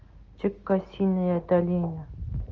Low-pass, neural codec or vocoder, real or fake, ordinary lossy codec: 7.2 kHz; none; real; Opus, 24 kbps